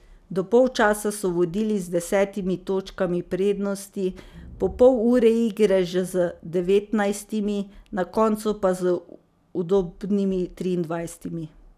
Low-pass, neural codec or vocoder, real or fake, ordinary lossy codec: 14.4 kHz; none; real; none